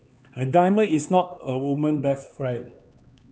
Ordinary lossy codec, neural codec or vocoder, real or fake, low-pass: none; codec, 16 kHz, 2 kbps, X-Codec, HuBERT features, trained on LibriSpeech; fake; none